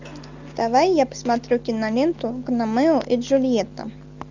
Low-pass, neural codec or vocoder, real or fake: 7.2 kHz; none; real